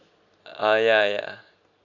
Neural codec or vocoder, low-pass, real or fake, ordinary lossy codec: none; 7.2 kHz; real; none